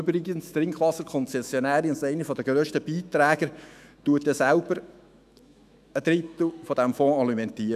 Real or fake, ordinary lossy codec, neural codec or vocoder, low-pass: fake; none; autoencoder, 48 kHz, 128 numbers a frame, DAC-VAE, trained on Japanese speech; 14.4 kHz